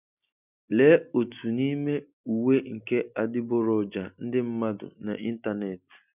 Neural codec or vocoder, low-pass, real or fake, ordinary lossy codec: none; 3.6 kHz; real; none